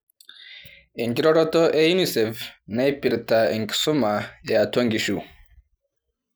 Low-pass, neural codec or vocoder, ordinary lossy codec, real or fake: none; none; none; real